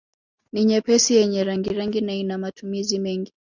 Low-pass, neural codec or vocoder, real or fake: 7.2 kHz; none; real